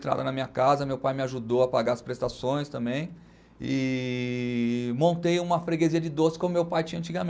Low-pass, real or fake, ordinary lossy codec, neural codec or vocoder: none; real; none; none